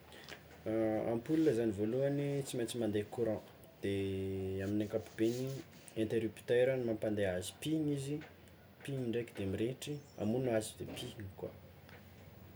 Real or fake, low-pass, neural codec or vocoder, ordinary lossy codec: real; none; none; none